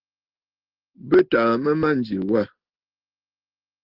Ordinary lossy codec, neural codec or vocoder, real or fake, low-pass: Opus, 16 kbps; none; real; 5.4 kHz